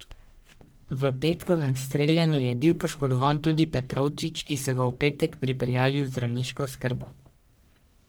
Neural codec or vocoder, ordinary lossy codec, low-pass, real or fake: codec, 44.1 kHz, 1.7 kbps, Pupu-Codec; none; none; fake